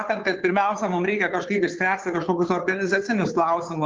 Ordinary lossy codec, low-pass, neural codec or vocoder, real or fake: Opus, 24 kbps; 7.2 kHz; codec, 16 kHz, 2 kbps, FunCodec, trained on Chinese and English, 25 frames a second; fake